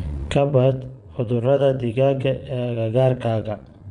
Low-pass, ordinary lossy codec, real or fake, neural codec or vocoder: 9.9 kHz; none; fake; vocoder, 22.05 kHz, 80 mel bands, Vocos